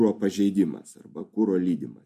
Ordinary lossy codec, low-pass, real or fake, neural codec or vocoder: MP3, 64 kbps; 14.4 kHz; real; none